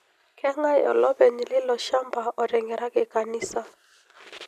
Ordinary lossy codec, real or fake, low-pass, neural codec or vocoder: none; real; 14.4 kHz; none